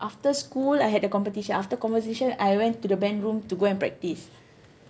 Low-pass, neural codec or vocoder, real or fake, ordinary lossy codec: none; none; real; none